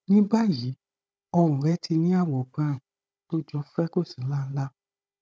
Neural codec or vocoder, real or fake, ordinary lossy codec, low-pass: codec, 16 kHz, 16 kbps, FunCodec, trained on Chinese and English, 50 frames a second; fake; none; none